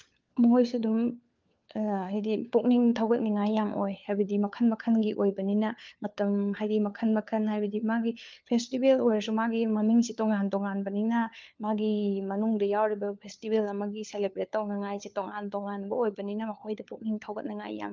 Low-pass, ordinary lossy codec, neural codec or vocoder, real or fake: 7.2 kHz; Opus, 24 kbps; codec, 16 kHz, 4 kbps, FunCodec, trained on LibriTTS, 50 frames a second; fake